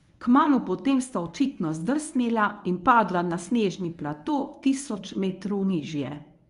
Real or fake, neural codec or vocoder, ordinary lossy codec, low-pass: fake; codec, 24 kHz, 0.9 kbps, WavTokenizer, medium speech release version 1; MP3, 96 kbps; 10.8 kHz